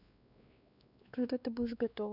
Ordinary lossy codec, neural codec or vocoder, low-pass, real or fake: none; codec, 16 kHz, 2 kbps, X-Codec, WavLM features, trained on Multilingual LibriSpeech; 5.4 kHz; fake